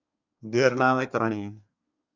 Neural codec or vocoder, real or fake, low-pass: codec, 24 kHz, 1 kbps, SNAC; fake; 7.2 kHz